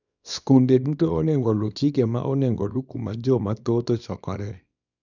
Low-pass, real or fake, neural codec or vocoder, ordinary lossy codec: 7.2 kHz; fake; codec, 24 kHz, 0.9 kbps, WavTokenizer, small release; none